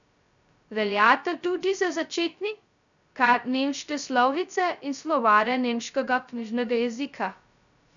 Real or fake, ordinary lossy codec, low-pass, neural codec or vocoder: fake; none; 7.2 kHz; codec, 16 kHz, 0.2 kbps, FocalCodec